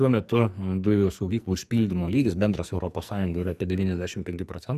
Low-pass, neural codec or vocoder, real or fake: 14.4 kHz; codec, 44.1 kHz, 2.6 kbps, SNAC; fake